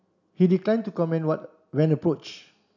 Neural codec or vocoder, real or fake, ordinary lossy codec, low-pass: none; real; none; 7.2 kHz